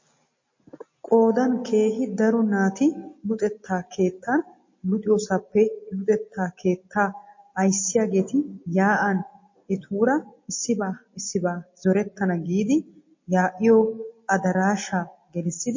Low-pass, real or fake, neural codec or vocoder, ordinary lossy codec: 7.2 kHz; real; none; MP3, 32 kbps